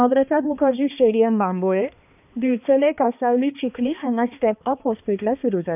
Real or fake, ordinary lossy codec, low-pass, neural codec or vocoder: fake; none; 3.6 kHz; codec, 16 kHz, 2 kbps, X-Codec, HuBERT features, trained on balanced general audio